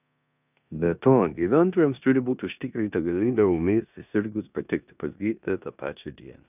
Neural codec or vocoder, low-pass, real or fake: codec, 16 kHz in and 24 kHz out, 0.9 kbps, LongCat-Audio-Codec, four codebook decoder; 3.6 kHz; fake